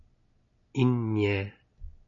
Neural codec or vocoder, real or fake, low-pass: none; real; 7.2 kHz